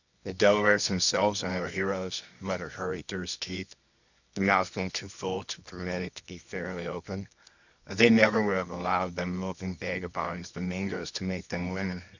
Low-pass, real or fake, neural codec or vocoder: 7.2 kHz; fake; codec, 24 kHz, 0.9 kbps, WavTokenizer, medium music audio release